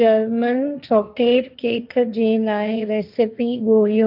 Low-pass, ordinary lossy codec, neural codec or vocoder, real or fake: 5.4 kHz; none; codec, 16 kHz, 1.1 kbps, Voila-Tokenizer; fake